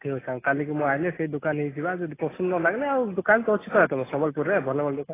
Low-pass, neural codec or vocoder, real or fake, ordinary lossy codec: 3.6 kHz; none; real; AAC, 16 kbps